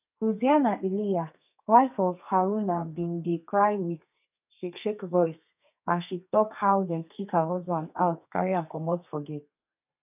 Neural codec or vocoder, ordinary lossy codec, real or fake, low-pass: codec, 32 kHz, 1.9 kbps, SNAC; none; fake; 3.6 kHz